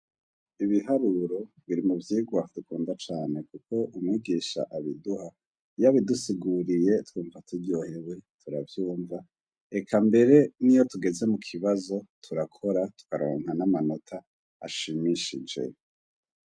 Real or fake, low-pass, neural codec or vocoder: real; 9.9 kHz; none